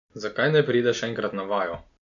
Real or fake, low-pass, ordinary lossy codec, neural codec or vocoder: real; 7.2 kHz; none; none